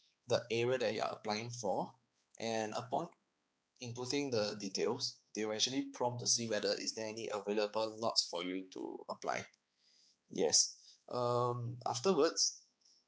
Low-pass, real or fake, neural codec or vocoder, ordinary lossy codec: none; fake; codec, 16 kHz, 4 kbps, X-Codec, HuBERT features, trained on balanced general audio; none